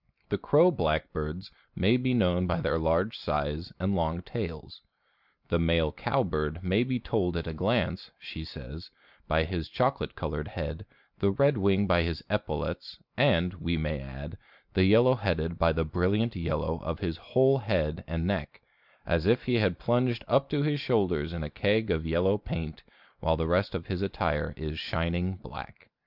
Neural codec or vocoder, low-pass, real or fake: none; 5.4 kHz; real